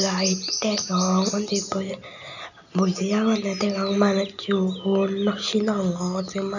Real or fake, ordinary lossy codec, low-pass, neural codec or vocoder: real; none; 7.2 kHz; none